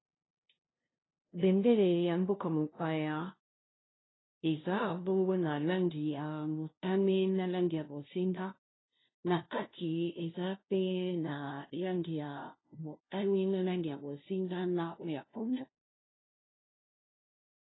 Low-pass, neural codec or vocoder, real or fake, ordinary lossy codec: 7.2 kHz; codec, 16 kHz, 0.5 kbps, FunCodec, trained on LibriTTS, 25 frames a second; fake; AAC, 16 kbps